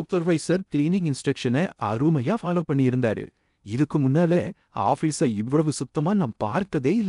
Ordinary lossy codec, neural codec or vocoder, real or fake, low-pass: none; codec, 16 kHz in and 24 kHz out, 0.6 kbps, FocalCodec, streaming, 2048 codes; fake; 10.8 kHz